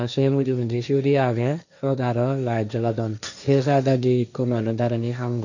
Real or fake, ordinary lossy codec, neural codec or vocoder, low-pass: fake; none; codec, 16 kHz, 1.1 kbps, Voila-Tokenizer; 7.2 kHz